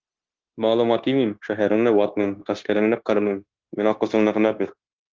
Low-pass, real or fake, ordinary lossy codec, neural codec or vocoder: 7.2 kHz; fake; Opus, 16 kbps; codec, 16 kHz, 0.9 kbps, LongCat-Audio-Codec